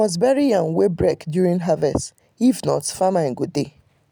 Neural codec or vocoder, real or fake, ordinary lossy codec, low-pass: none; real; none; none